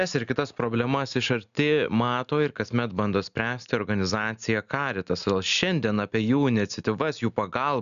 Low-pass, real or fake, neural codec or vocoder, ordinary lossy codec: 7.2 kHz; real; none; MP3, 96 kbps